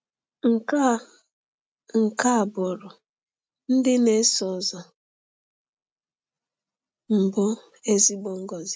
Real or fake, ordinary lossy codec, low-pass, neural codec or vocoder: real; none; none; none